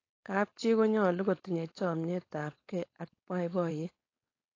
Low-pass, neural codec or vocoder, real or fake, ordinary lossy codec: 7.2 kHz; codec, 16 kHz, 4.8 kbps, FACodec; fake; AAC, 32 kbps